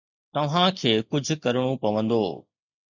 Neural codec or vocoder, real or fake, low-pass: none; real; 7.2 kHz